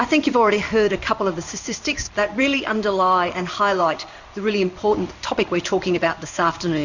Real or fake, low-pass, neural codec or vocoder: fake; 7.2 kHz; codec, 16 kHz in and 24 kHz out, 1 kbps, XY-Tokenizer